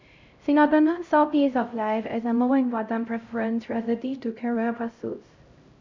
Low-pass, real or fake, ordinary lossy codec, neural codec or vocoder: 7.2 kHz; fake; MP3, 64 kbps; codec, 16 kHz, 0.5 kbps, X-Codec, HuBERT features, trained on LibriSpeech